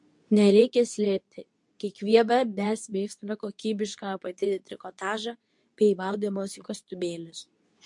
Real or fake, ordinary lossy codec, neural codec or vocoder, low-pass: fake; MP3, 64 kbps; codec, 24 kHz, 0.9 kbps, WavTokenizer, medium speech release version 2; 10.8 kHz